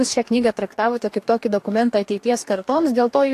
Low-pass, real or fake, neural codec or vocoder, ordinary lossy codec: 14.4 kHz; fake; codec, 44.1 kHz, 2.6 kbps, DAC; AAC, 64 kbps